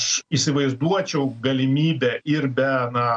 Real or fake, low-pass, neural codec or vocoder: real; 9.9 kHz; none